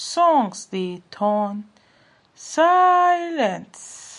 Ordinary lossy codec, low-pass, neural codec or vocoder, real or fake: MP3, 48 kbps; 14.4 kHz; none; real